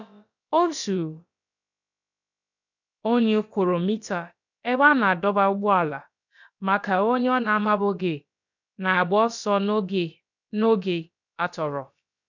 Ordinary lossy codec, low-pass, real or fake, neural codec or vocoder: none; 7.2 kHz; fake; codec, 16 kHz, about 1 kbps, DyCAST, with the encoder's durations